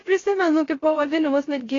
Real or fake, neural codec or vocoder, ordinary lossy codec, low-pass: fake; codec, 16 kHz, 0.3 kbps, FocalCodec; AAC, 32 kbps; 7.2 kHz